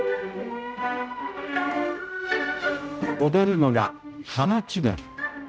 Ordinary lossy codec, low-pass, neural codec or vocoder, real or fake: none; none; codec, 16 kHz, 0.5 kbps, X-Codec, HuBERT features, trained on general audio; fake